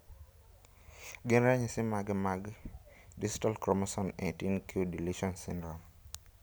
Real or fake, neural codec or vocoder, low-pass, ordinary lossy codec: real; none; none; none